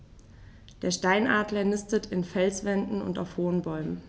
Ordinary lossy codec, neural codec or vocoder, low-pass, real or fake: none; none; none; real